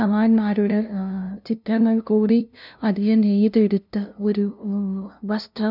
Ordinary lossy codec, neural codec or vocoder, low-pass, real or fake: none; codec, 16 kHz, 0.5 kbps, FunCodec, trained on LibriTTS, 25 frames a second; 5.4 kHz; fake